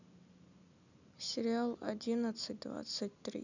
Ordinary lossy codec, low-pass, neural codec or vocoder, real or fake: none; 7.2 kHz; none; real